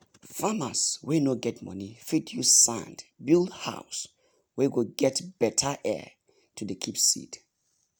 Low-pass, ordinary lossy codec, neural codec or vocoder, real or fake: none; none; none; real